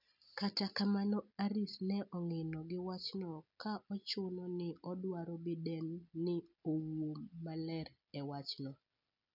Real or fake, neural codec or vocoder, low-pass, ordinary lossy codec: real; none; 5.4 kHz; none